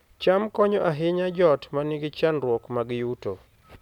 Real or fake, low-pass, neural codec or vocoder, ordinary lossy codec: real; 19.8 kHz; none; none